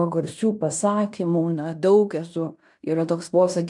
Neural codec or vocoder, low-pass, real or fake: codec, 16 kHz in and 24 kHz out, 0.9 kbps, LongCat-Audio-Codec, fine tuned four codebook decoder; 10.8 kHz; fake